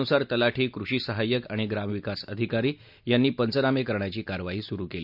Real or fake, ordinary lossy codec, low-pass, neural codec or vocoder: real; none; 5.4 kHz; none